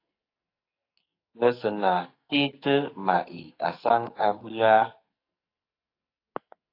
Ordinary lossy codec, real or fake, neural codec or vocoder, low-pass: AAC, 32 kbps; fake; codec, 44.1 kHz, 2.6 kbps, SNAC; 5.4 kHz